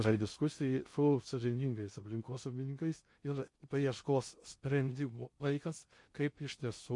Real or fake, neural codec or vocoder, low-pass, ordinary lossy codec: fake; codec, 16 kHz in and 24 kHz out, 0.6 kbps, FocalCodec, streaming, 2048 codes; 10.8 kHz; MP3, 48 kbps